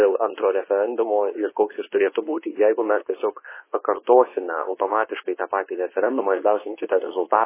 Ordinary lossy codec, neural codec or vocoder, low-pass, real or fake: MP3, 16 kbps; codec, 24 kHz, 0.9 kbps, WavTokenizer, medium speech release version 2; 3.6 kHz; fake